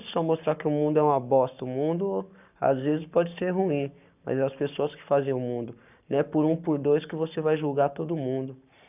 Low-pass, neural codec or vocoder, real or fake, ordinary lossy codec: 3.6 kHz; codec, 44.1 kHz, 7.8 kbps, Pupu-Codec; fake; none